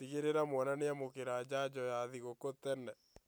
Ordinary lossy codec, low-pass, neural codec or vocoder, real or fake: none; none; none; real